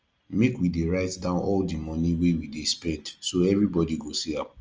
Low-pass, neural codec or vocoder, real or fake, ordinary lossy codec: 7.2 kHz; none; real; Opus, 24 kbps